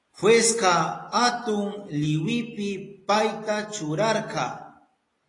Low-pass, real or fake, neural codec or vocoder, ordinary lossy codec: 10.8 kHz; real; none; AAC, 32 kbps